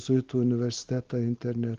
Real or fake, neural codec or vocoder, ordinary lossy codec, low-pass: real; none; Opus, 16 kbps; 7.2 kHz